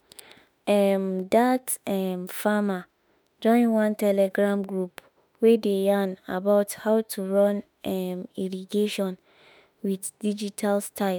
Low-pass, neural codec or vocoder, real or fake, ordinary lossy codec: none; autoencoder, 48 kHz, 32 numbers a frame, DAC-VAE, trained on Japanese speech; fake; none